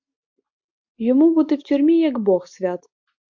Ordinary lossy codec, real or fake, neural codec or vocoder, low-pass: MP3, 48 kbps; real; none; 7.2 kHz